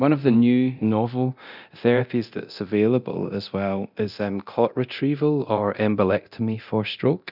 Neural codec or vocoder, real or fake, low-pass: codec, 24 kHz, 0.9 kbps, DualCodec; fake; 5.4 kHz